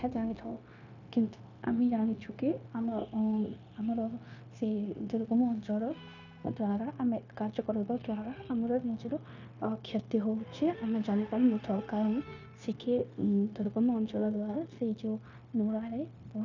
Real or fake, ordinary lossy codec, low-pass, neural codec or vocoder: fake; none; 7.2 kHz; codec, 16 kHz, 0.9 kbps, LongCat-Audio-Codec